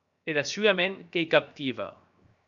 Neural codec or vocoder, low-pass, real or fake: codec, 16 kHz, 0.7 kbps, FocalCodec; 7.2 kHz; fake